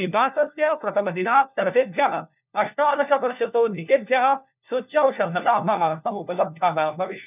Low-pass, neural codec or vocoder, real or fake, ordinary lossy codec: 3.6 kHz; codec, 16 kHz, 1 kbps, FunCodec, trained on LibriTTS, 50 frames a second; fake; none